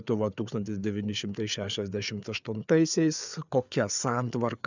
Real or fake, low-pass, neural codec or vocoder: fake; 7.2 kHz; codec, 16 kHz, 4 kbps, FreqCodec, larger model